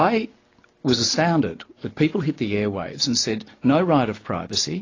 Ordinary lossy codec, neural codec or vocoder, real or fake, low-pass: AAC, 32 kbps; none; real; 7.2 kHz